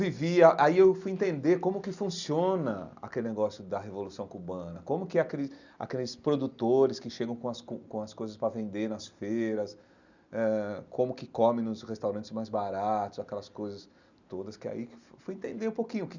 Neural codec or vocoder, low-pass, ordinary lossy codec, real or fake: none; 7.2 kHz; none; real